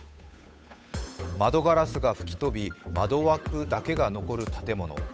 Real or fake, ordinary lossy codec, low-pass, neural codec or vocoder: fake; none; none; codec, 16 kHz, 8 kbps, FunCodec, trained on Chinese and English, 25 frames a second